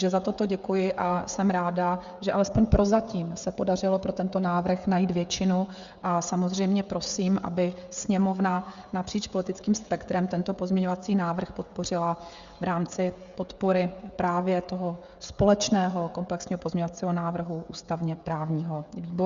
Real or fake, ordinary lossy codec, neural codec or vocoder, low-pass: fake; Opus, 64 kbps; codec, 16 kHz, 16 kbps, FreqCodec, smaller model; 7.2 kHz